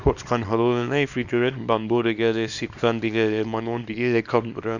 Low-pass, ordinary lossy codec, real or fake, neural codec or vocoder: 7.2 kHz; none; fake; codec, 24 kHz, 0.9 kbps, WavTokenizer, small release